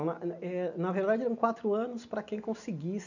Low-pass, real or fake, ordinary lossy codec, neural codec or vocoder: 7.2 kHz; real; none; none